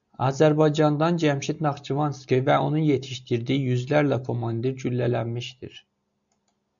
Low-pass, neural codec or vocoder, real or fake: 7.2 kHz; none; real